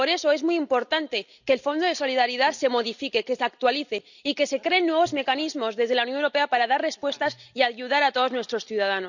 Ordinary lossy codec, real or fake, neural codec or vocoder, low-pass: none; real; none; 7.2 kHz